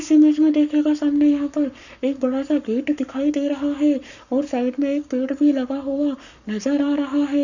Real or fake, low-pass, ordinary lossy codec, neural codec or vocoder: fake; 7.2 kHz; none; codec, 44.1 kHz, 7.8 kbps, Pupu-Codec